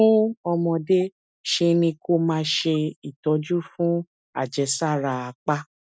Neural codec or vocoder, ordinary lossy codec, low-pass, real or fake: none; none; none; real